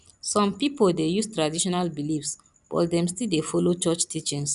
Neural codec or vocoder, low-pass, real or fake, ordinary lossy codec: none; 10.8 kHz; real; none